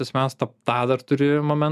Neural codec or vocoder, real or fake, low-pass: none; real; 14.4 kHz